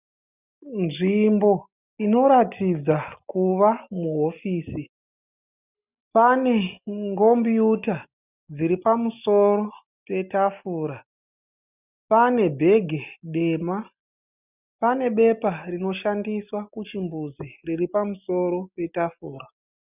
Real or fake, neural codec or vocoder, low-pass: real; none; 3.6 kHz